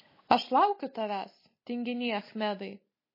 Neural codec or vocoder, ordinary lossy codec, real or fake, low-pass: none; MP3, 24 kbps; real; 5.4 kHz